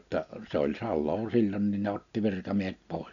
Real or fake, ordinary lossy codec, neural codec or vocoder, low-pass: real; none; none; 7.2 kHz